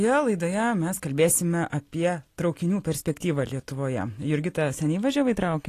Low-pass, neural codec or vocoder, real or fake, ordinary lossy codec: 14.4 kHz; none; real; AAC, 48 kbps